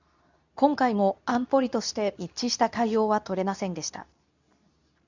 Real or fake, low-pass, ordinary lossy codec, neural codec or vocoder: fake; 7.2 kHz; none; codec, 24 kHz, 0.9 kbps, WavTokenizer, medium speech release version 2